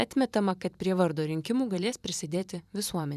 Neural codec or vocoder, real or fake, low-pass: vocoder, 44.1 kHz, 128 mel bands every 512 samples, BigVGAN v2; fake; 14.4 kHz